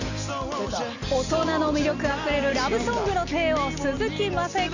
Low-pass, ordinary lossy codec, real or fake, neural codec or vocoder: 7.2 kHz; none; real; none